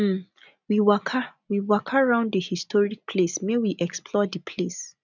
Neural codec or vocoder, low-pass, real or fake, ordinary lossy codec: none; 7.2 kHz; real; none